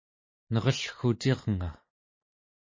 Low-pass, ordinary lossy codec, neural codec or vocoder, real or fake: 7.2 kHz; MP3, 32 kbps; none; real